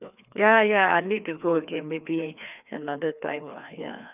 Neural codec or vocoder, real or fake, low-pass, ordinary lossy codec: codec, 16 kHz, 2 kbps, FreqCodec, larger model; fake; 3.6 kHz; none